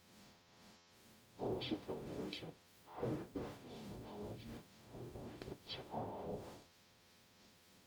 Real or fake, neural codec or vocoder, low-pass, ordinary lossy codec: fake; codec, 44.1 kHz, 0.9 kbps, DAC; none; none